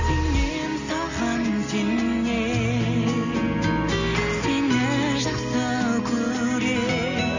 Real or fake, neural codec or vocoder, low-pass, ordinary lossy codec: real; none; 7.2 kHz; none